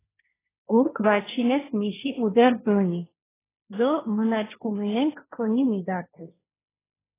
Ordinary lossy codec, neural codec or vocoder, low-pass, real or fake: AAC, 16 kbps; codec, 16 kHz, 1.1 kbps, Voila-Tokenizer; 3.6 kHz; fake